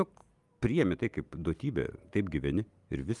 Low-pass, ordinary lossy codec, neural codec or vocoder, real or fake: 10.8 kHz; Opus, 32 kbps; none; real